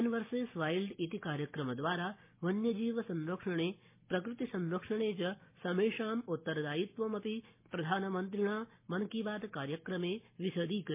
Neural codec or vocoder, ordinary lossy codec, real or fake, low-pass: none; MP3, 24 kbps; real; 3.6 kHz